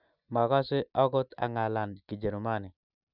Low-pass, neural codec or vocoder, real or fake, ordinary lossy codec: 5.4 kHz; none; real; none